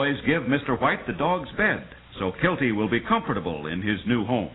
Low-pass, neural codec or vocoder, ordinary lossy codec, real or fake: 7.2 kHz; none; AAC, 16 kbps; real